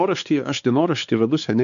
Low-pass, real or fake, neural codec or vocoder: 7.2 kHz; fake; codec, 16 kHz, 4 kbps, X-Codec, WavLM features, trained on Multilingual LibriSpeech